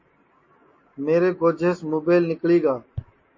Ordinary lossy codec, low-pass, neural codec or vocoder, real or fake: MP3, 32 kbps; 7.2 kHz; none; real